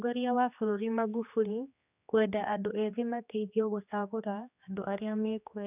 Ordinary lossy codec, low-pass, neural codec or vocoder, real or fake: none; 3.6 kHz; codec, 16 kHz, 2 kbps, X-Codec, HuBERT features, trained on general audio; fake